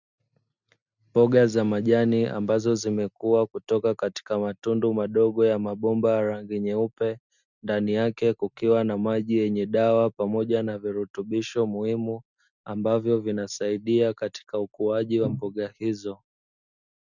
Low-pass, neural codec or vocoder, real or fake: 7.2 kHz; none; real